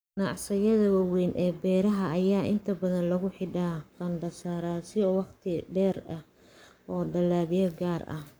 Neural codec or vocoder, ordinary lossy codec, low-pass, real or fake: codec, 44.1 kHz, 7.8 kbps, Pupu-Codec; none; none; fake